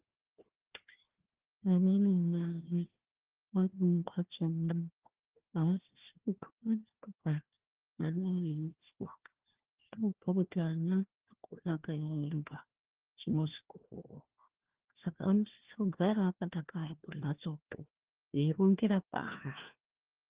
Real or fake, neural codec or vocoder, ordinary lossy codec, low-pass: fake; codec, 16 kHz, 1 kbps, FunCodec, trained on Chinese and English, 50 frames a second; Opus, 16 kbps; 3.6 kHz